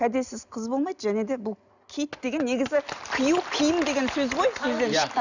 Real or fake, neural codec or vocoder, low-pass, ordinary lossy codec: real; none; 7.2 kHz; none